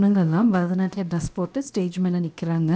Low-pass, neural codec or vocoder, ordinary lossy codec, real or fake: none; codec, 16 kHz, 0.7 kbps, FocalCodec; none; fake